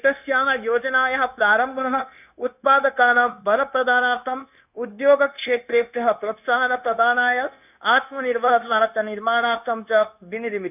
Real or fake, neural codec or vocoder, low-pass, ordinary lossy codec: fake; codec, 16 kHz, 0.9 kbps, LongCat-Audio-Codec; 3.6 kHz; none